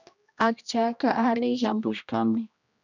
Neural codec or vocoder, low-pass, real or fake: codec, 16 kHz, 1 kbps, X-Codec, HuBERT features, trained on general audio; 7.2 kHz; fake